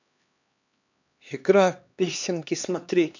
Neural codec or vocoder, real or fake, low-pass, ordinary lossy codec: codec, 16 kHz, 2 kbps, X-Codec, HuBERT features, trained on LibriSpeech; fake; 7.2 kHz; none